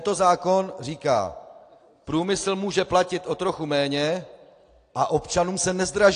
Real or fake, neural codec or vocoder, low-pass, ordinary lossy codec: real; none; 9.9 kHz; AAC, 48 kbps